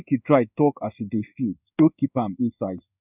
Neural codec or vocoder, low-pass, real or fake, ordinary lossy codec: codec, 16 kHz in and 24 kHz out, 1 kbps, XY-Tokenizer; 3.6 kHz; fake; none